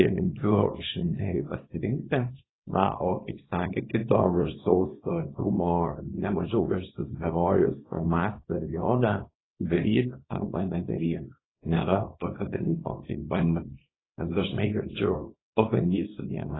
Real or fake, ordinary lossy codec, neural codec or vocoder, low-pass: fake; AAC, 16 kbps; codec, 24 kHz, 0.9 kbps, WavTokenizer, small release; 7.2 kHz